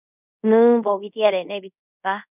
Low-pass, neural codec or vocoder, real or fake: 3.6 kHz; codec, 24 kHz, 0.5 kbps, DualCodec; fake